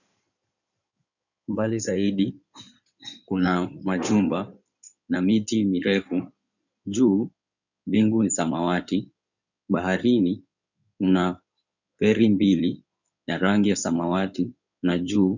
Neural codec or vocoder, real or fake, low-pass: codec, 16 kHz in and 24 kHz out, 2.2 kbps, FireRedTTS-2 codec; fake; 7.2 kHz